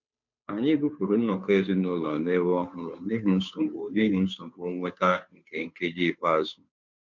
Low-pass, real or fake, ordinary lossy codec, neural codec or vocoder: 7.2 kHz; fake; MP3, 64 kbps; codec, 16 kHz, 2 kbps, FunCodec, trained on Chinese and English, 25 frames a second